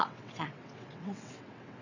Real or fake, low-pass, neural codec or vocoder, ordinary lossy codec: fake; 7.2 kHz; vocoder, 22.05 kHz, 80 mel bands, WaveNeXt; none